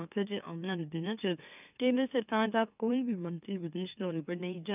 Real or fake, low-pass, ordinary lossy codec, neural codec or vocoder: fake; 3.6 kHz; none; autoencoder, 44.1 kHz, a latent of 192 numbers a frame, MeloTTS